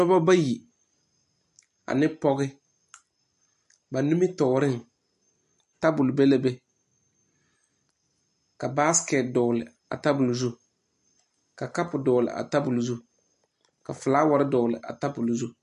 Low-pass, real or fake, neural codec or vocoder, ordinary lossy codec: 14.4 kHz; real; none; MP3, 48 kbps